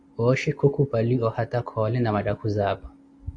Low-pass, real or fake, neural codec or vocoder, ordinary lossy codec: 9.9 kHz; real; none; AAC, 48 kbps